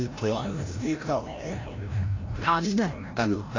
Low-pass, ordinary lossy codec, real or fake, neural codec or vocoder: 7.2 kHz; AAC, 32 kbps; fake; codec, 16 kHz, 0.5 kbps, FreqCodec, larger model